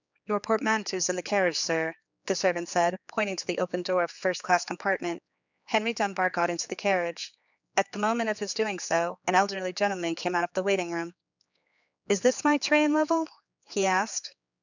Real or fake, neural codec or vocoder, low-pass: fake; codec, 16 kHz, 4 kbps, X-Codec, HuBERT features, trained on general audio; 7.2 kHz